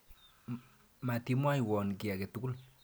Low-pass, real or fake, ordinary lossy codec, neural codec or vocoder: none; real; none; none